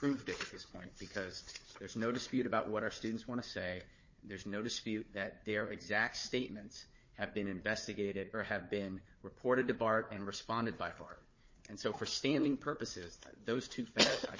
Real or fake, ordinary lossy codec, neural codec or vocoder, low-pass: fake; MP3, 32 kbps; codec, 16 kHz, 4 kbps, FunCodec, trained on Chinese and English, 50 frames a second; 7.2 kHz